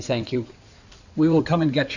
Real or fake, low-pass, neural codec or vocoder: fake; 7.2 kHz; codec, 16 kHz in and 24 kHz out, 2.2 kbps, FireRedTTS-2 codec